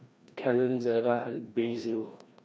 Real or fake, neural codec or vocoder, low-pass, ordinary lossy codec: fake; codec, 16 kHz, 1 kbps, FreqCodec, larger model; none; none